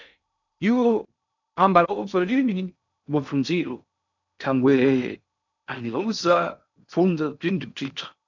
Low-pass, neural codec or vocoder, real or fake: 7.2 kHz; codec, 16 kHz in and 24 kHz out, 0.6 kbps, FocalCodec, streaming, 2048 codes; fake